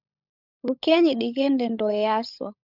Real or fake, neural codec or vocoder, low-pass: fake; codec, 16 kHz, 16 kbps, FunCodec, trained on LibriTTS, 50 frames a second; 5.4 kHz